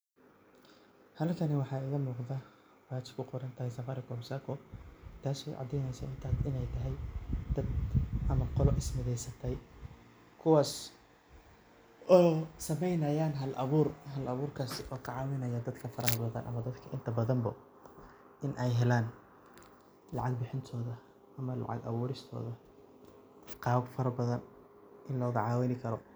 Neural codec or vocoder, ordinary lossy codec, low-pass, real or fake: none; none; none; real